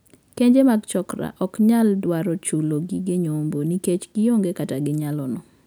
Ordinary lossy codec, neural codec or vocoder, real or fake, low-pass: none; none; real; none